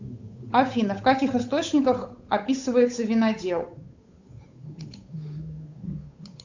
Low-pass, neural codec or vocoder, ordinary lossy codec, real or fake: 7.2 kHz; codec, 16 kHz, 8 kbps, FunCodec, trained on Chinese and English, 25 frames a second; AAC, 48 kbps; fake